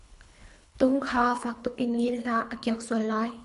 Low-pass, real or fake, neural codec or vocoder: 10.8 kHz; fake; codec, 24 kHz, 3 kbps, HILCodec